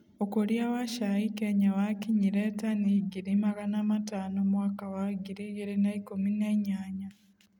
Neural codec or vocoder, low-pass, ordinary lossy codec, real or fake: none; 19.8 kHz; none; real